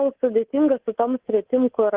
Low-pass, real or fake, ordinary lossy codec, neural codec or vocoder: 3.6 kHz; fake; Opus, 16 kbps; codec, 24 kHz, 3.1 kbps, DualCodec